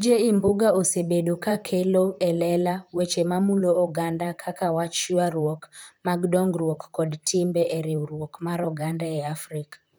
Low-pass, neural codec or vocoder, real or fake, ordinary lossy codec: none; vocoder, 44.1 kHz, 128 mel bands, Pupu-Vocoder; fake; none